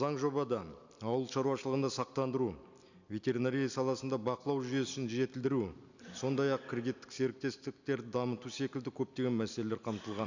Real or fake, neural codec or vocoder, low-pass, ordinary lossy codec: real; none; 7.2 kHz; none